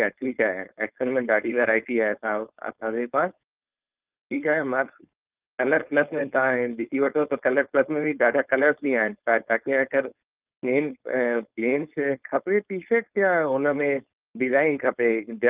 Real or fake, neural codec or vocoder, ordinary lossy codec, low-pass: fake; codec, 16 kHz, 4.8 kbps, FACodec; Opus, 24 kbps; 3.6 kHz